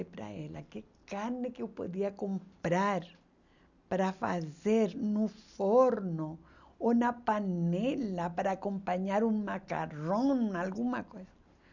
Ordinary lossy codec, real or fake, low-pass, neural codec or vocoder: none; real; 7.2 kHz; none